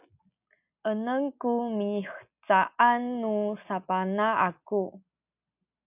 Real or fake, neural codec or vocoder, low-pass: real; none; 3.6 kHz